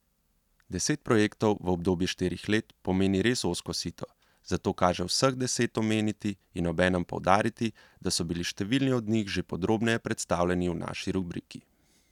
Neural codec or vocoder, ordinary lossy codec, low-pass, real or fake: none; none; 19.8 kHz; real